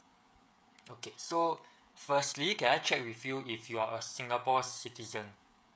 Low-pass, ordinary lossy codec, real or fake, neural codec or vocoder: none; none; fake; codec, 16 kHz, 16 kbps, FreqCodec, smaller model